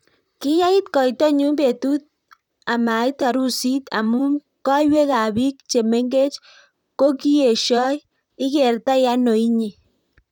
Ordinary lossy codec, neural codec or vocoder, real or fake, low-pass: none; vocoder, 44.1 kHz, 128 mel bands, Pupu-Vocoder; fake; 19.8 kHz